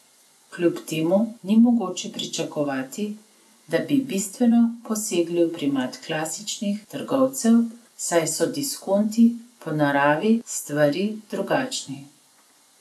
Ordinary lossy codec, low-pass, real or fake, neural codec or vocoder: none; none; fake; vocoder, 24 kHz, 100 mel bands, Vocos